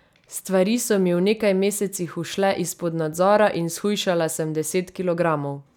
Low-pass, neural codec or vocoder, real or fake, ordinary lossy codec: 19.8 kHz; none; real; none